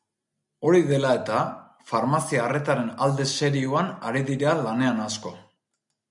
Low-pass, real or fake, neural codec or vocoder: 10.8 kHz; real; none